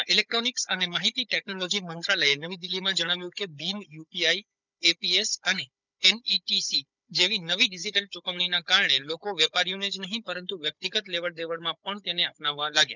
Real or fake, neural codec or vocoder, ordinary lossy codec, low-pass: fake; codec, 16 kHz, 16 kbps, FunCodec, trained on Chinese and English, 50 frames a second; none; 7.2 kHz